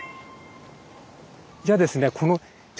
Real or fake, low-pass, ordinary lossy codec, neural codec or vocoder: real; none; none; none